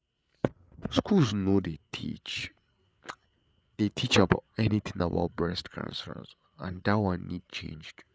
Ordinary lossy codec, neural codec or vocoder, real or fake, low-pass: none; codec, 16 kHz, 8 kbps, FreqCodec, larger model; fake; none